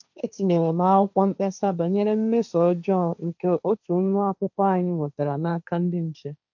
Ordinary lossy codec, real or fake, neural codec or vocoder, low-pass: none; fake; codec, 16 kHz, 1.1 kbps, Voila-Tokenizer; 7.2 kHz